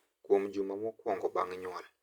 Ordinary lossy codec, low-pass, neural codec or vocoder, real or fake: Opus, 64 kbps; 19.8 kHz; none; real